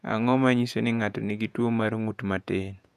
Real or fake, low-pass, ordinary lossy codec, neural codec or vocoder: fake; 14.4 kHz; none; vocoder, 48 kHz, 128 mel bands, Vocos